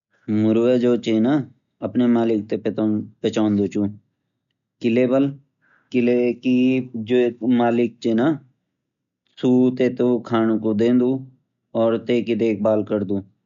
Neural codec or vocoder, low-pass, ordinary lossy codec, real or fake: none; 7.2 kHz; none; real